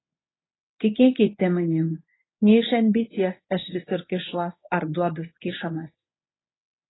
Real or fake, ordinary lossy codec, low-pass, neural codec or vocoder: fake; AAC, 16 kbps; 7.2 kHz; codec, 24 kHz, 0.9 kbps, WavTokenizer, medium speech release version 1